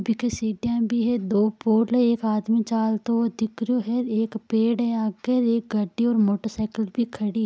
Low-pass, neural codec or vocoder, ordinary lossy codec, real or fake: none; none; none; real